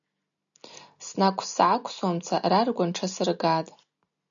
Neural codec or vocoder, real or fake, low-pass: none; real; 7.2 kHz